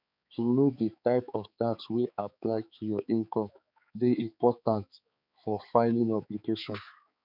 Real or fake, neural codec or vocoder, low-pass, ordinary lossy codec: fake; codec, 16 kHz, 4 kbps, X-Codec, HuBERT features, trained on balanced general audio; 5.4 kHz; none